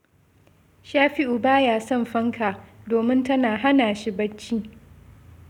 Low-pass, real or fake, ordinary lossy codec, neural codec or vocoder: 19.8 kHz; real; none; none